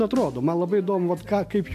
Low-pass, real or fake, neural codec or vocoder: 14.4 kHz; real; none